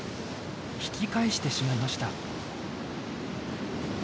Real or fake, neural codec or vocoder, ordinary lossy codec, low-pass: real; none; none; none